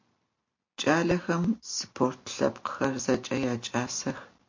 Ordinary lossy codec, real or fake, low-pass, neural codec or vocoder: MP3, 48 kbps; real; 7.2 kHz; none